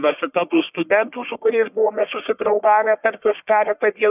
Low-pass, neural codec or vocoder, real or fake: 3.6 kHz; codec, 44.1 kHz, 1.7 kbps, Pupu-Codec; fake